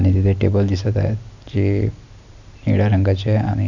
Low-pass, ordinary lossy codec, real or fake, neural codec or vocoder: 7.2 kHz; none; real; none